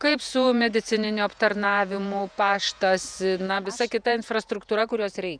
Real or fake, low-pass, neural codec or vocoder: fake; 9.9 kHz; vocoder, 48 kHz, 128 mel bands, Vocos